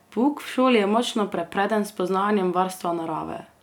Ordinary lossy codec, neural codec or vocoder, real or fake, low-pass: none; none; real; 19.8 kHz